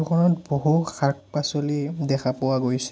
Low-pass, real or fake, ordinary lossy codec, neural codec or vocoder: none; real; none; none